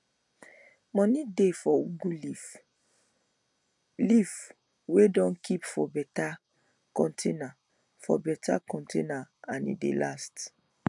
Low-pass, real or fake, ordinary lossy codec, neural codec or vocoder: 10.8 kHz; real; none; none